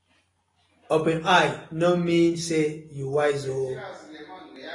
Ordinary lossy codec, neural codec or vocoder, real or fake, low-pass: AAC, 32 kbps; none; real; 10.8 kHz